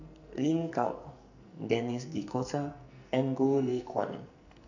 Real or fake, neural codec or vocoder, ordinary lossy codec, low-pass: fake; codec, 44.1 kHz, 2.6 kbps, SNAC; none; 7.2 kHz